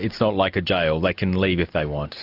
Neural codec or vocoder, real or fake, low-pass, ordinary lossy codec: none; real; 5.4 kHz; Opus, 64 kbps